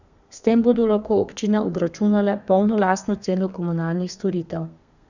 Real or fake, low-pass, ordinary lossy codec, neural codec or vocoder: fake; 7.2 kHz; none; codec, 32 kHz, 1.9 kbps, SNAC